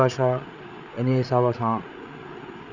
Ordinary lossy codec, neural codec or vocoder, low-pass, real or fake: none; codec, 16 kHz, 8 kbps, FreqCodec, larger model; 7.2 kHz; fake